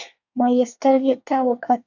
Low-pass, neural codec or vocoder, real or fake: 7.2 kHz; codec, 24 kHz, 1 kbps, SNAC; fake